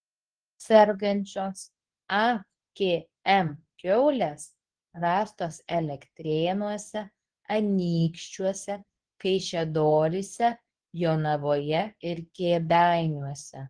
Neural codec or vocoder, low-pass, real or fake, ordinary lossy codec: codec, 24 kHz, 0.9 kbps, WavTokenizer, medium speech release version 2; 9.9 kHz; fake; Opus, 16 kbps